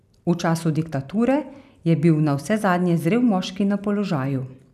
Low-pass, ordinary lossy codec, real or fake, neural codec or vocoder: 14.4 kHz; none; real; none